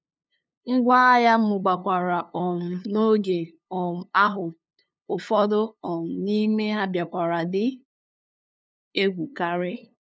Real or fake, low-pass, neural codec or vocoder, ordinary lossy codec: fake; none; codec, 16 kHz, 2 kbps, FunCodec, trained on LibriTTS, 25 frames a second; none